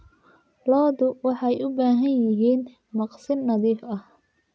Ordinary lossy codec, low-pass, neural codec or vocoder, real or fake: none; none; none; real